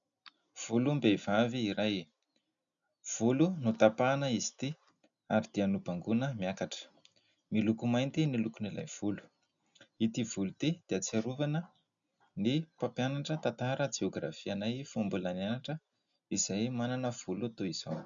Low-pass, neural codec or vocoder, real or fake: 7.2 kHz; none; real